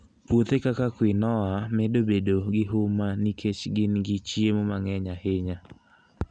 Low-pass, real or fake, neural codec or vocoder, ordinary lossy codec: 9.9 kHz; real; none; none